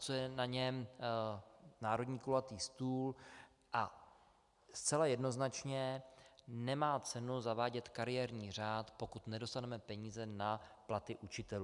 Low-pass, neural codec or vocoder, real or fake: 10.8 kHz; none; real